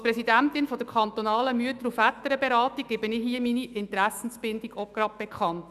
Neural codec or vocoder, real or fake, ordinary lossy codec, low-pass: autoencoder, 48 kHz, 128 numbers a frame, DAC-VAE, trained on Japanese speech; fake; none; 14.4 kHz